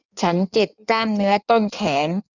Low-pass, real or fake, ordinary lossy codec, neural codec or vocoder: 7.2 kHz; fake; none; codec, 16 kHz in and 24 kHz out, 1.1 kbps, FireRedTTS-2 codec